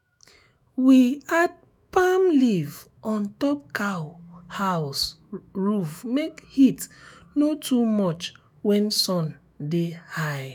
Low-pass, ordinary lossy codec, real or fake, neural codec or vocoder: none; none; fake; autoencoder, 48 kHz, 128 numbers a frame, DAC-VAE, trained on Japanese speech